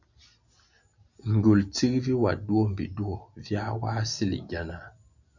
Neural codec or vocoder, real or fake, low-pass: none; real; 7.2 kHz